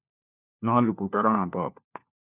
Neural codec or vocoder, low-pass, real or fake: codec, 16 kHz, 1 kbps, FunCodec, trained on LibriTTS, 50 frames a second; 3.6 kHz; fake